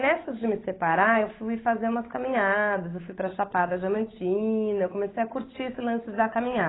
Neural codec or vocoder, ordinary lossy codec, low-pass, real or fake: codec, 16 kHz, 8 kbps, FunCodec, trained on Chinese and English, 25 frames a second; AAC, 16 kbps; 7.2 kHz; fake